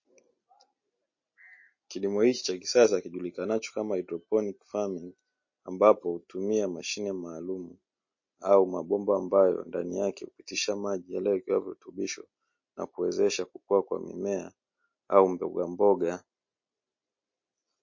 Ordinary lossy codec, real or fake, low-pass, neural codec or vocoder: MP3, 32 kbps; real; 7.2 kHz; none